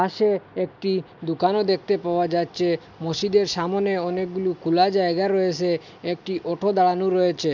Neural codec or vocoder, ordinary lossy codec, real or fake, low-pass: none; MP3, 64 kbps; real; 7.2 kHz